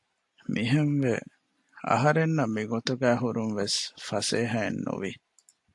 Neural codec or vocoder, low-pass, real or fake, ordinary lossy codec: none; 10.8 kHz; real; AAC, 64 kbps